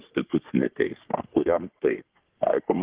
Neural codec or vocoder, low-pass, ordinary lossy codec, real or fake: codec, 44.1 kHz, 3.4 kbps, Pupu-Codec; 3.6 kHz; Opus, 16 kbps; fake